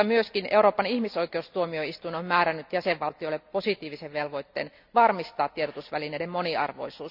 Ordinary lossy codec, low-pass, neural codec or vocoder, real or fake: none; 5.4 kHz; none; real